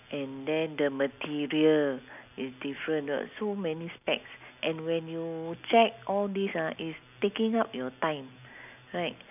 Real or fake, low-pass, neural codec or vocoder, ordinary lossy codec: real; 3.6 kHz; none; none